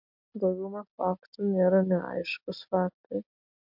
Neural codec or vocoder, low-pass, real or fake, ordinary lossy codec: none; 5.4 kHz; real; MP3, 48 kbps